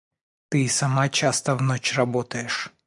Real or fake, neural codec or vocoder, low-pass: real; none; 10.8 kHz